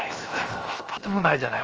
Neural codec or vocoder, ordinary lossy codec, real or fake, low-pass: codec, 16 kHz, 0.7 kbps, FocalCodec; Opus, 24 kbps; fake; 7.2 kHz